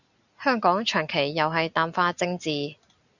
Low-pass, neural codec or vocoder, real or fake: 7.2 kHz; none; real